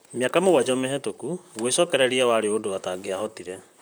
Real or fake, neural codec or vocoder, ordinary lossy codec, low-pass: fake; vocoder, 44.1 kHz, 128 mel bands, Pupu-Vocoder; none; none